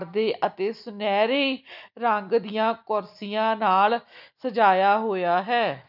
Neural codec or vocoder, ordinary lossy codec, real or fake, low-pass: none; none; real; 5.4 kHz